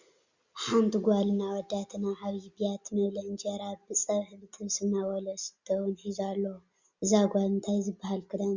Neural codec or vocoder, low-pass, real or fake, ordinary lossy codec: none; 7.2 kHz; real; Opus, 64 kbps